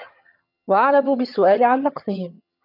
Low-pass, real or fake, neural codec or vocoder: 5.4 kHz; fake; vocoder, 22.05 kHz, 80 mel bands, HiFi-GAN